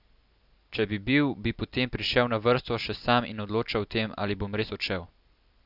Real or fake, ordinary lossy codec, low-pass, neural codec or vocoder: real; none; 5.4 kHz; none